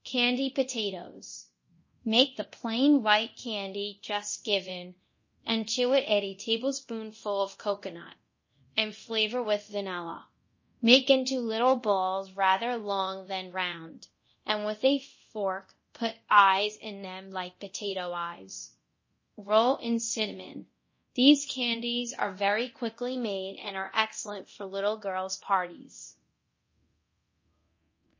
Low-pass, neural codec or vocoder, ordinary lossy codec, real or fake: 7.2 kHz; codec, 24 kHz, 0.9 kbps, DualCodec; MP3, 32 kbps; fake